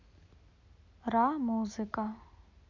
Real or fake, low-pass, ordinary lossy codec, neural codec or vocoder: real; 7.2 kHz; none; none